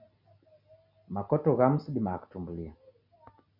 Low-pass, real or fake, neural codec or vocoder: 5.4 kHz; real; none